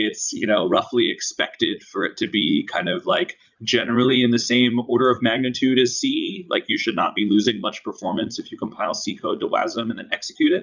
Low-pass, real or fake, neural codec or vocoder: 7.2 kHz; fake; vocoder, 44.1 kHz, 80 mel bands, Vocos